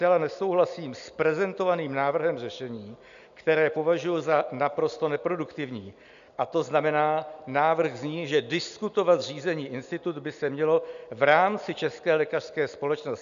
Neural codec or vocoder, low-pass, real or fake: none; 7.2 kHz; real